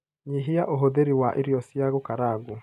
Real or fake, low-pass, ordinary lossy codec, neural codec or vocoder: real; 14.4 kHz; none; none